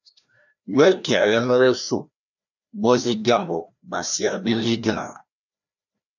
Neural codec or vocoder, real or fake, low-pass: codec, 16 kHz, 1 kbps, FreqCodec, larger model; fake; 7.2 kHz